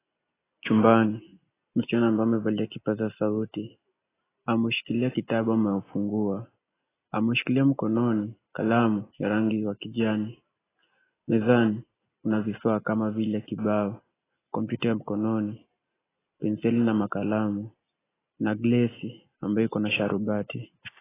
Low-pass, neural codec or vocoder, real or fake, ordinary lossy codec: 3.6 kHz; none; real; AAC, 16 kbps